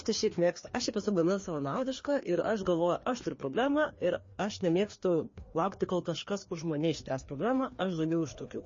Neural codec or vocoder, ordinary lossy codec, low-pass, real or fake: codec, 16 kHz, 2 kbps, FreqCodec, larger model; MP3, 32 kbps; 7.2 kHz; fake